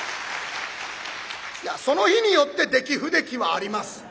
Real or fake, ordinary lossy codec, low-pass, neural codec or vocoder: real; none; none; none